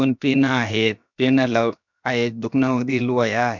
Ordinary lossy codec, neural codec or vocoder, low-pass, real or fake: none; codec, 16 kHz, about 1 kbps, DyCAST, with the encoder's durations; 7.2 kHz; fake